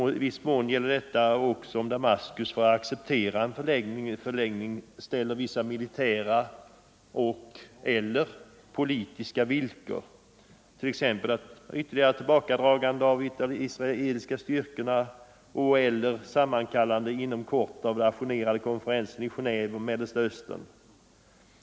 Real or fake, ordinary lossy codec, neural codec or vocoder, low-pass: real; none; none; none